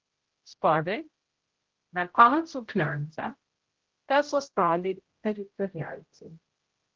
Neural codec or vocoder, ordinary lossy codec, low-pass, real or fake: codec, 16 kHz, 0.5 kbps, X-Codec, HuBERT features, trained on general audio; Opus, 16 kbps; 7.2 kHz; fake